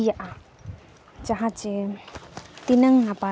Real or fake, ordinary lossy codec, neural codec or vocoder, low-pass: real; none; none; none